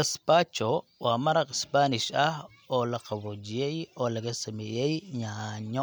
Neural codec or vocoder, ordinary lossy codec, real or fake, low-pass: none; none; real; none